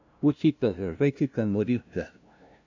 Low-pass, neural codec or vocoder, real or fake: 7.2 kHz; codec, 16 kHz, 0.5 kbps, FunCodec, trained on LibriTTS, 25 frames a second; fake